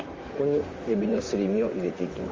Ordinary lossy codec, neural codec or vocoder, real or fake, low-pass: Opus, 32 kbps; vocoder, 44.1 kHz, 128 mel bands, Pupu-Vocoder; fake; 7.2 kHz